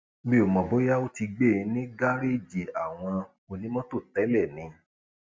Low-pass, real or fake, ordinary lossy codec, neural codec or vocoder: none; real; none; none